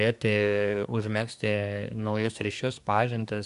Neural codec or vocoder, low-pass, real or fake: codec, 24 kHz, 1 kbps, SNAC; 10.8 kHz; fake